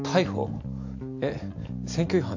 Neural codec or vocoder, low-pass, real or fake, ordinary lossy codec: none; 7.2 kHz; real; AAC, 48 kbps